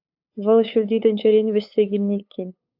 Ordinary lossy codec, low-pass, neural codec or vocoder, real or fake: AAC, 48 kbps; 5.4 kHz; codec, 16 kHz, 8 kbps, FunCodec, trained on LibriTTS, 25 frames a second; fake